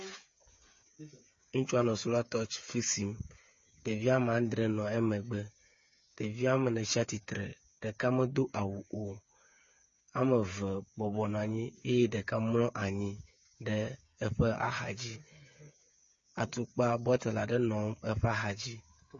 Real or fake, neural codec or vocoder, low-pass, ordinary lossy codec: fake; codec, 16 kHz, 8 kbps, FreqCodec, smaller model; 7.2 kHz; MP3, 32 kbps